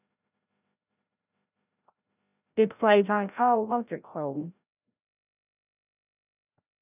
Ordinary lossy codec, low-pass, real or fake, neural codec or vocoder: none; 3.6 kHz; fake; codec, 16 kHz, 0.5 kbps, FreqCodec, larger model